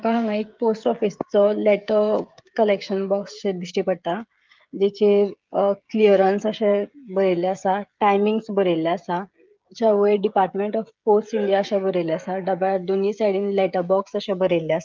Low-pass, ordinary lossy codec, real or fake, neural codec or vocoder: 7.2 kHz; Opus, 32 kbps; fake; codec, 16 kHz, 16 kbps, FreqCodec, smaller model